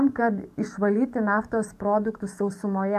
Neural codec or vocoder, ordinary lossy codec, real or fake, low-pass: codec, 44.1 kHz, 7.8 kbps, DAC; AAC, 96 kbps; fake; 14.4 kHz